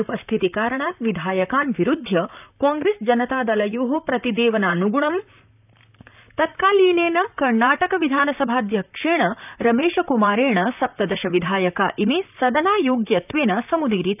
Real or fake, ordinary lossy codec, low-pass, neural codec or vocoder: fake; none; 3.6 kHz; vocoder, 44.1 kHz, 128 mel bands, Pupu-Vocoder